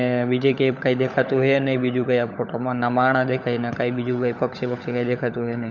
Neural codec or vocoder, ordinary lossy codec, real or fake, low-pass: codec, 16 kHz, 16 kbps, FunCodec, trained on LibriTTS, 50 frames a second; none; fake; 7.2 kHz